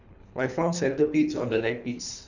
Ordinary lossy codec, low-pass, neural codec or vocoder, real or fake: none; 7.2 kHz; codec, 24 kHz, 3 kbps, HILCodec; fake